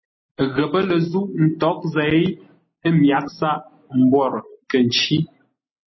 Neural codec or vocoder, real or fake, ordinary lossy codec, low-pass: none; real; MP3, 24 kbps; 7.2 kHz